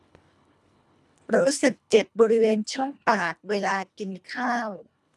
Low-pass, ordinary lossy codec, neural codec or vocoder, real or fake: none; none; codec, 24 kHz, 1.5 kbps, HILCodec; fake